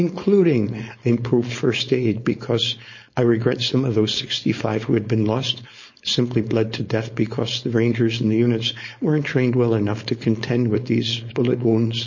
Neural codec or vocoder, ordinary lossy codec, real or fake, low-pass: codec, 16 kHz, 4.8 kbps, FACodec; MP3, 32 kbps; fake; 7.2 kHz